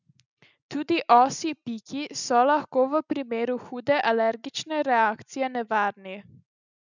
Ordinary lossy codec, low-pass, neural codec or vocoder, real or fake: none; 7.2 kHz; none; real